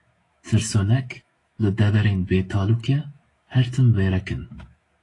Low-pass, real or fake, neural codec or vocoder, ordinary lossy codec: 10.8 kHz; fake; autoencoder, 48 kHz, 128 numbers a frame, DAC-VAE, trained on Japanese speech; AAC, 32 kbps